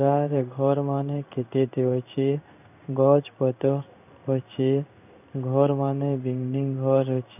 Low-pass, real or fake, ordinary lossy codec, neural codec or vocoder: 3.6 kHz; fake; none; codec, 16 kHz in and 24 kHz out, 1 kbps, XY-Tokenizer